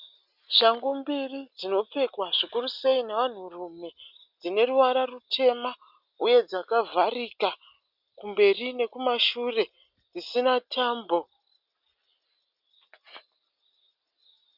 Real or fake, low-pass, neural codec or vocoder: real; 5.4 kHz; none